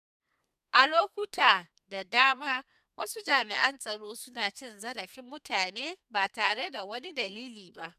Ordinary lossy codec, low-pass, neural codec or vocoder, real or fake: none; 14.4 kHz; codec, 32 kHz, 1.9 kbps, SNAC; fake